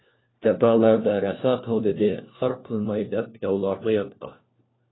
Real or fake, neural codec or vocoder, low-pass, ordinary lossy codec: fake; codec, 16 kHz, 1 kbps, FunCodec, trained on LibriTTS, 50 frames a second; 7.2 kHz; AAC, 16 kbps